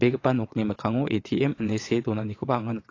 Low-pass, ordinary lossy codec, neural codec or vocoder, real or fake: 7.2 kHz; AAC, 32 kbps; vocoder, 22.05 kHz, 80 mel bands, WaveNeXt; fake